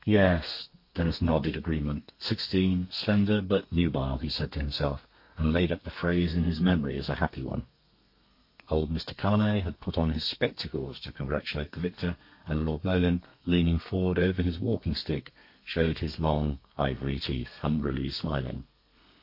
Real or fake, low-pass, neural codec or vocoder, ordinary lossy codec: fake; 5.4 kHz; codec, 32 kHz, 1.9 kbps, SNAC; MP3, 32 kbps